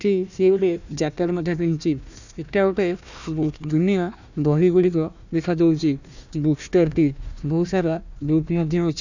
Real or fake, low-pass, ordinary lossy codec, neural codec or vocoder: fake; 7.2 kHz; none; codec, 16 kHz, 1 kbps, FunCodec, trained on Chinese and English, 50 frames a second